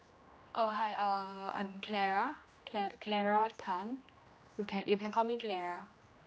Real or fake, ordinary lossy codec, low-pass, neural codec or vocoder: fake; none; none; codec, 16 kHz, 1 kbps, X-Codec, HuBERT features, trained on general audio